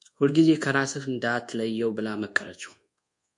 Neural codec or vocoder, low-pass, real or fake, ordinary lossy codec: codec, 24 kHz, 1.2 kbps, DualCodec; 10.8 kHz; fake; MP3, 64 kbps